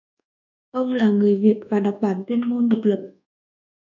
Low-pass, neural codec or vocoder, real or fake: 7.2 kHz; codec, 24 kHz, 1.2 kbps, DualCodec; fake